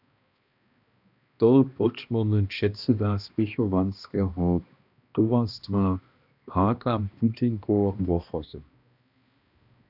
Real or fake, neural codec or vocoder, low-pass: fake; codec, 16 kHz, 1 kbps, X-Codec, HuBERT features, trained on balanced general audio; 5.4 kHz